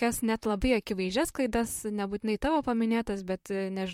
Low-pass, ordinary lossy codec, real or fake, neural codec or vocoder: 19.8 kHz; MP3, 64 kbps; fake; vocoder, 44.1 kHz, 128 mel bands, Pupu-Vocoder